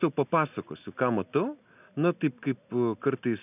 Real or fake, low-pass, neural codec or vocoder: real; 3.6 kHz; none